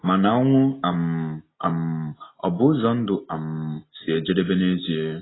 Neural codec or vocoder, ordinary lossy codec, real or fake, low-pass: none; AAC, 16 kbps; real; 7.2 kHz